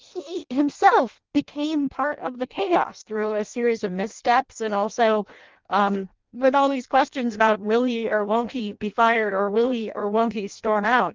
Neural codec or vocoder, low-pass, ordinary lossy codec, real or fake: codec, 16 kHz in and 24 kHz out, 0.6 kbps, FireRedTTS-2 codec; 7.2 kHz; Opus, 32 kbps; fake